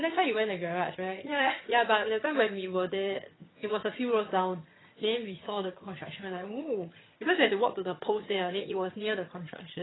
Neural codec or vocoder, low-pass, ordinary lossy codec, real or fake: vocoder, 22.05 kHz, 80 mel bands, HiFi-GAN; 7.2 kHz; AAC, 16 kbps; fake